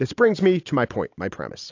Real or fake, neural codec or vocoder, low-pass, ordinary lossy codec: real; none; 7.2 kHz; AAC, 48 kbps